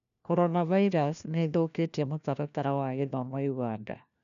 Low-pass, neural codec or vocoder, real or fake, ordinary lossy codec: 7.2 kHz; codec, 16 kHz, 1 kbps, FunCodec, trained on LibriTTS, 50 frames a second; fake; none